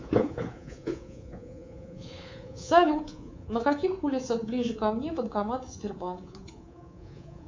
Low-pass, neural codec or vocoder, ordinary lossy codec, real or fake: 7.2 kHz; codec, 24 kHz, 3.1 kbps, DualCodec; MP3, 64 kbps; fake